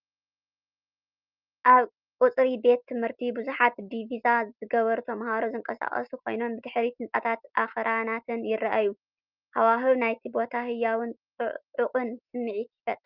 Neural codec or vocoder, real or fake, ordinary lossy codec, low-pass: none; real; Opus, 24 kbps; 5.4 kHz